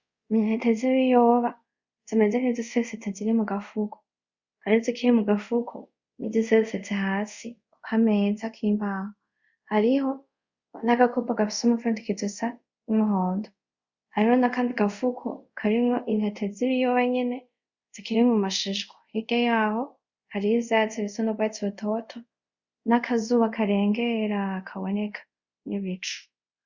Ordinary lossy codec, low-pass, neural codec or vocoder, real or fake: Opus, 64 kbps; 7.2 kHz; codec, 24 kHz, 0.5 kbps, DualCodec; fake